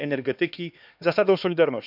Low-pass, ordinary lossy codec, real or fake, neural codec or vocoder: 5.4 kHz; none; fake; codec, 16 kHz, 2 kbps, X-Codec, HuBERT features, trained on LibriSpeech